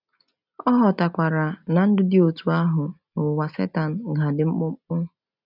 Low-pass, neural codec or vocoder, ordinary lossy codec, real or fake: 5.4 kHz; none; none; real